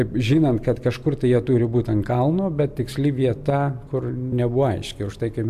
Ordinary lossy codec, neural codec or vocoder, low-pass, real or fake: AAC, 96 kbps; none; 14.4 kHz; real